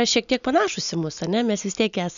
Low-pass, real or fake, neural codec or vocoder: 7.2 kHz; real; none